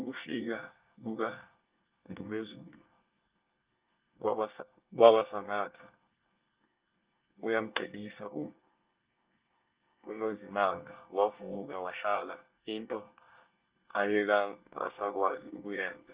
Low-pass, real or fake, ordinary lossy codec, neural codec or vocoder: 3.6 kHz; fake; Opus, 32 kbps; codec, 24 kHz, 1 kbps, SNAC